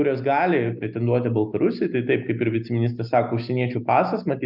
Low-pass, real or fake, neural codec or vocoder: 5.4 kHz; real; none